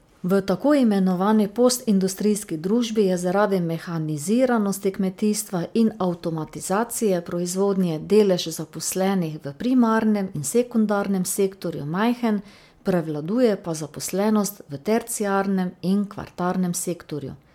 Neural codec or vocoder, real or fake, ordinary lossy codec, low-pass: none; real; MP3, 96 kbps; 19.8 kHz